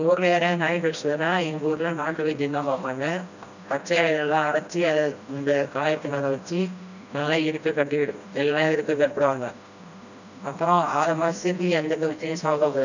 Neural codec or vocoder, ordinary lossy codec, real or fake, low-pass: codec, 16 kHz, 1 kbps, FreqCodec, smaller model; none; fake; 7.2 kHz